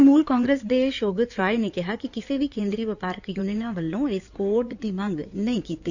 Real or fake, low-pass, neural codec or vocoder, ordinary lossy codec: fake; 7.2 kHz; codec, 16 kHz in and 24 kHz out, 2.2 kbps, FireRedTTS-2 codec; none